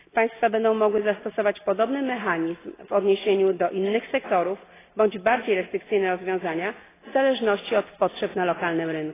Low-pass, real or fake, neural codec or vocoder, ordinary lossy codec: 3.6 kHz; real; none; AAC, 16 kbps